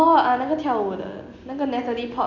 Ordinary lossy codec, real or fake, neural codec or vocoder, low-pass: none; real; none; 7.2 kHz